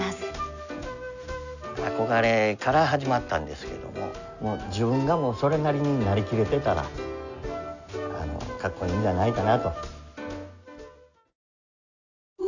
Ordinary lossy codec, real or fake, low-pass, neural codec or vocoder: none; real; 7.2 kHz; none